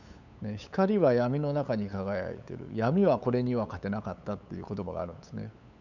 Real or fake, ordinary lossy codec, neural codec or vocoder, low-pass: fake; none; codec, 16 kHz, 8 kbps, FunCodec, trained on LibriTTS, 25 frames a second; 7.2 kHz